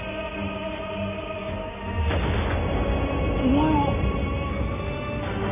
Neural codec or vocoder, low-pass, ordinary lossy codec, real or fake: none; 3.6 kHz; none; real